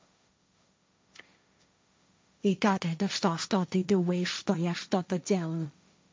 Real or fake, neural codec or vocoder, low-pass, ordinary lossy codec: fake; codec, 16 kHz, 1.1 kbps, Voila-Tokenizer; none; none